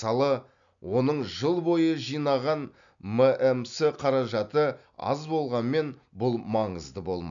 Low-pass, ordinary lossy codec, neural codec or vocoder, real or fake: 7.2 kHz; none; none; real